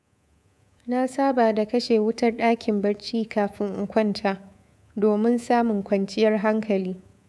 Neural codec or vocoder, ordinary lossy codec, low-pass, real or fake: codec, 24 kHz, 3.1 kbps, DualCodec; none; none; fake